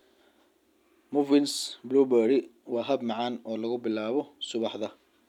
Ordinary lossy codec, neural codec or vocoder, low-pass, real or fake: none; none; 19.8 kHz; real